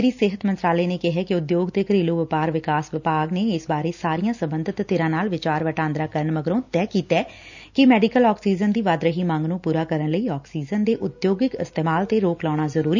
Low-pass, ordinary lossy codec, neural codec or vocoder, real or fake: 7.2 kHz; none; none; real